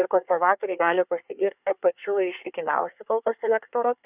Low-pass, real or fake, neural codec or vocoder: 3.6 kHz; fake; codec, 24 kHz, 1 kbps, SNAC